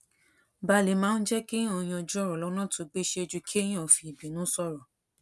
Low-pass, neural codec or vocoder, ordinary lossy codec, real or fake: none; none; none; real